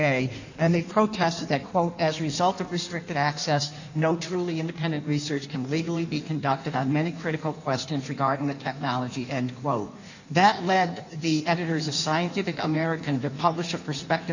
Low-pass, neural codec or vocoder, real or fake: 7.2 kHz; codec, 16 kHz in and 24 kHz out, 1.1 kbps, FireRedTTS-2 codec; fake